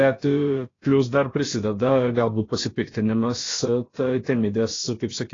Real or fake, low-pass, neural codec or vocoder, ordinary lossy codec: fake; 7.2 kHz; codec, 16 kHz, about 1 kbps, DyCAST, with the encoder's durations; AAC, 32 kbps